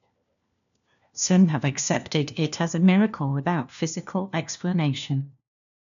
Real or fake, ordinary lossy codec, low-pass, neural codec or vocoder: fake; none; 7.2 kHz; codec, 16 kHz, 1 kbps, FunCodec, trained on LibriTTS, 50 frames a second